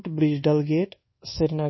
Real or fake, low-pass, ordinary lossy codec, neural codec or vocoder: fake; 7.2 kHz; MP3, 24 kbps; codec, 24 kHz, 1.2 kbps, DualCodec